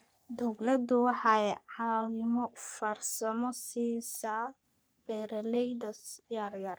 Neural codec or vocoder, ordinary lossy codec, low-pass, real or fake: codec, 44.1 kHz, 3.4 kbps, Pupu-Codec; none; none; fake